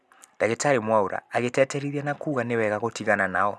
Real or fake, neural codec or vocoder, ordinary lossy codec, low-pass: real; none; none; none